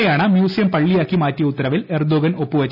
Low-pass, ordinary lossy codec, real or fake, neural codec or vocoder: 5.4 kHz; none; real; none